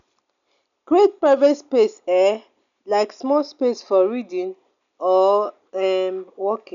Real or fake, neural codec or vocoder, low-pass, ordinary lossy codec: real; none; 7.2 kHz; none